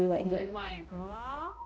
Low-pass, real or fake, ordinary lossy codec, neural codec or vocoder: none; fake; none; codec, 16 kHz, 0.5 kbps, X-Codec, HuBERT features, trained on balanced general audio